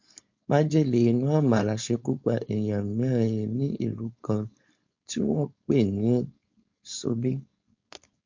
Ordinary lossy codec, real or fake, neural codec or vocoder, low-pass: MP3, 48 kbps; fake; codec, 16 kHz, 4.8 kbps, FACodec; 7.2 kHz